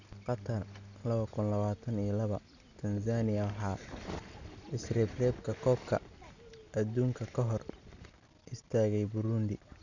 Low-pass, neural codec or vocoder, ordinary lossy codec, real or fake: 7.2 kHz; none; none; real